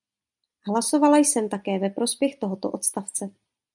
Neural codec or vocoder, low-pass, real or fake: none; 10.8 kHz; real